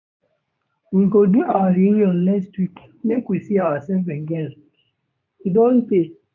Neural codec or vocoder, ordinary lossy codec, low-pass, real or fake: codec, 24 kHz, 0.9 kbps, WavTokenizer, medium speech release version 2; MP3, 64 kbps; 7.2 kHz; fake